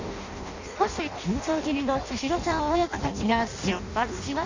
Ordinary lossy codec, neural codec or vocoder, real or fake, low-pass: Opus, 64 kbps; codec, 16 kHz in and 24 kHz out, 0.6 kbps, FireRedTTS-2 codec; fake; 7.2 kHz